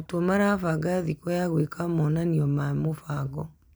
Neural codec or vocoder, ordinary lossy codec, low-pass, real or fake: none; none; none; real